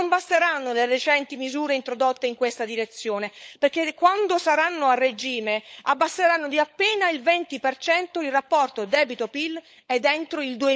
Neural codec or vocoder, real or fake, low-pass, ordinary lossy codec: codec, 16 kHz, 16 kbps, FunCodec, trained on LibriTTS, 50 frames a second; fake; none; none